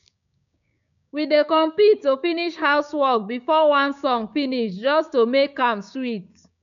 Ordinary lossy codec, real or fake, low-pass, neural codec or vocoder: none; fake; 7.2 kHz; codec, 16 kHz, 6 kbps, DAC